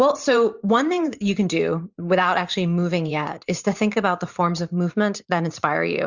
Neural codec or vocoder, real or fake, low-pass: vocoder, 44.1 kHz, 128 mel bands every 512 samples, BigVGAN v2; fake; 7.2 kHz